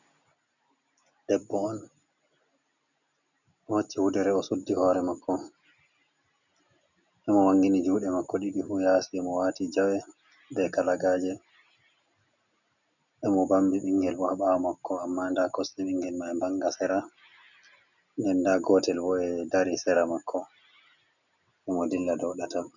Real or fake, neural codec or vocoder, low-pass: real; none; 7.2 kHz